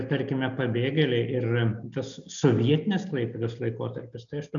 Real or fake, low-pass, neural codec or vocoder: real; 7.2 kHz; none